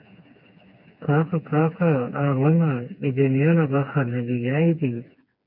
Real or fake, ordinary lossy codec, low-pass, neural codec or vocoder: fake; MP3, 48 kbps; 5.4 kHz; codec, 16 kHz, 4 kbps, FreqCodec, smaller model